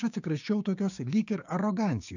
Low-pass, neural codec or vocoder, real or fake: 7.2 kHz; codec, 16 kHz, 6 kbps, DAC; fake